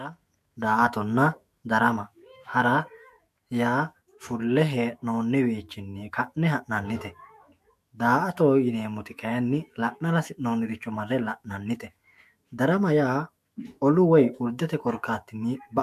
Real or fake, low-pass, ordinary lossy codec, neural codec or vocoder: fake; 14.4 kHz; MP3, 64 kbps; autoencoder, 48 kHz, 128 numbers a frame, DAC-VAE, trained on Japanese speech